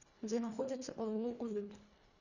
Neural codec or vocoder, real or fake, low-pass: codec, 24 kHz, 1.5 kbps, HILCodec; fake; 7.2 kHz